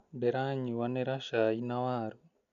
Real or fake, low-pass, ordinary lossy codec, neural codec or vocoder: real; 7.2 kHz; none; none